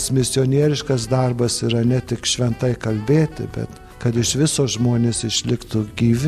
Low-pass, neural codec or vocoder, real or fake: 14.4 kHz; none; real